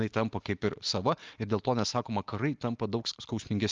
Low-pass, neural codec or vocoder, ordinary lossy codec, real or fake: 7.2 kHz; none; Opus, 24 kbps; real